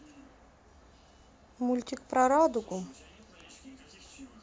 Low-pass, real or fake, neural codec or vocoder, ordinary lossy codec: none; real; none; none